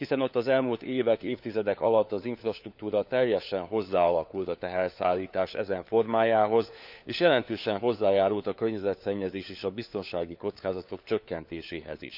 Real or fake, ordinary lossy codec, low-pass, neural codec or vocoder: fake; none; 5.4 kHz; codec, 16 kHz, 4 kbps, FunCodec, trained on LibriTTS, 50 frames a second